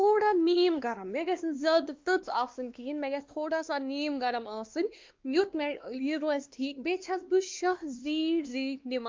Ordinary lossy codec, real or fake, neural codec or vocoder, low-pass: Opus, 24 kbps; fake; codec, 16 kHz, 2 kbps, X-Codec, WavLM features, trained on Multilingual LibriSpeech; 7.2 kHz